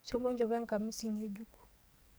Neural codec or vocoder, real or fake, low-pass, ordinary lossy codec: codec, 44.1 kHz, 2.6 kbps, SNAC; fake; none; none